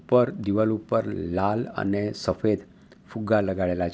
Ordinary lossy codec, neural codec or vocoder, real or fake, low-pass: none; none; real; none